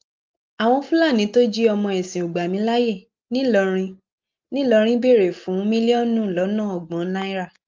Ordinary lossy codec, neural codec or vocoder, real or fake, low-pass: Opus, 24 kbps; none; real; 7.2 kHz